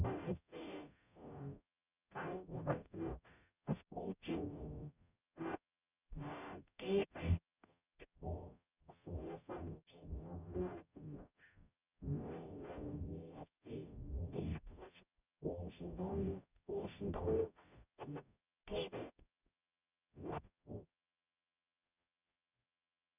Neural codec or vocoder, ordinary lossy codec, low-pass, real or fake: codec, 44.1 kHz, 0.9 kbps, DAC; none; 3.6 kHz; fake